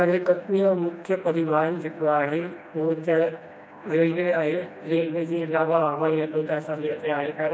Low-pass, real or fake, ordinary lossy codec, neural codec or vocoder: none; fake; none; codec, 16 kHz, 1 kbps, FreqCodec, smaller model